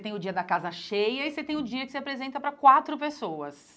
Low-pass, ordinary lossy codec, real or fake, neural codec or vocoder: none; none; real; none